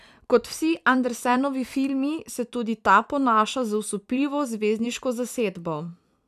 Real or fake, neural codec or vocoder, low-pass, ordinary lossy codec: fake; vocoder, 44.1 kHz, 128 mel bands every 256 samples, BigVGAN v2; 14.4 kHz; none